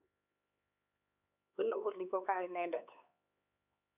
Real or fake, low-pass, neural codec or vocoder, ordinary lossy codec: fake; 3.6 kHz; codec, 16 kHz, 4 kbps, X-Codec, HuBERT features, trained on LibriSpeech; none